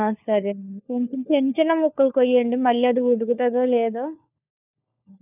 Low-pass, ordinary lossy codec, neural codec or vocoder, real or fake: 3.6 kHz; none; codec, 16 kHz, 4 kbps, FunCodec, trained on LibriTTS, 50 frames a second; fake